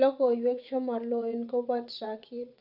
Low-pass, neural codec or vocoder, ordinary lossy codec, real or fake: 5.4 kHz; vocoder, 22.05 kHz, 80 mel bands, WaveNeXt; none; fake